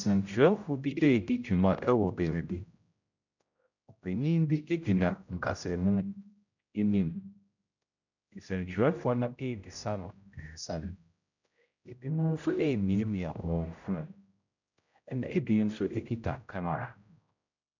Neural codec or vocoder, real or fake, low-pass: codec, 16 kHz, 0.5 kbps, X-Codec, HuBERT features, trained on general audio; fake; 7.2 kHz